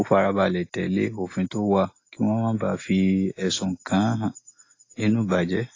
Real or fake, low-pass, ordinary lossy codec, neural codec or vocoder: real; 7.2 kHz; AAC, 32 kbps; none